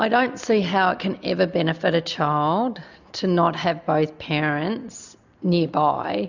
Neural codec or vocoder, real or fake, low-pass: none; real; 7.2 kHz